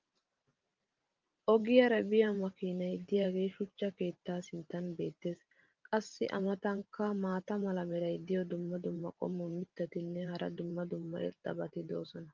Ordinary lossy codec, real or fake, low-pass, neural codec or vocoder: Opus, 24 kbps; fake; 7.2 kHz; vocoder, 44.1 kHz, 128 mel bands every 512 samples, BigVGAN v2